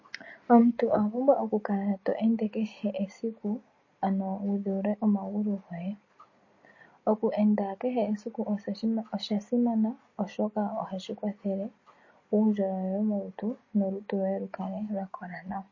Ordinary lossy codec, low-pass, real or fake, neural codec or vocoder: MP3, 32 kbps; 7.2 kHz; real; none